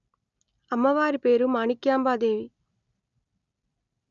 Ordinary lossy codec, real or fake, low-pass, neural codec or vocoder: none; real; 7.2 kHz; none